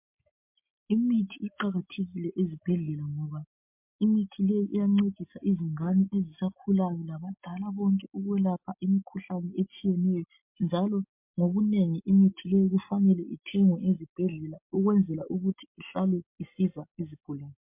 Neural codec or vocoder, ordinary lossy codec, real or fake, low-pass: none; MP3, 32 kbps; real; 3.6 kHz